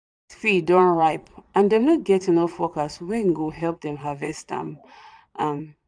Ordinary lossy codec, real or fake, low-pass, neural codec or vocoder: none; fake; 9.9 kHz; vocoder, 22.05 kHz, 80 mel bands, Vocos